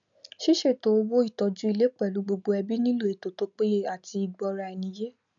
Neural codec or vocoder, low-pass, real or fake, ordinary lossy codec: none; 7.2 kHz; real; none